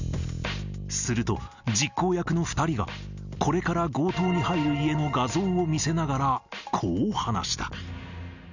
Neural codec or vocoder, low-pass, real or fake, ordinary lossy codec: none; 7.2 kHz; real; none